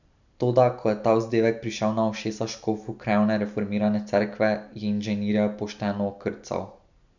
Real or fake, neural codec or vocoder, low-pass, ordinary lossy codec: real; none; 7.2 kHz; none